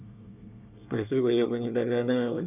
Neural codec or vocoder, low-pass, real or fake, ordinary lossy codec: codec, 24 kHz, 1 kbps, SNAC; 3.6 kHz; fake; none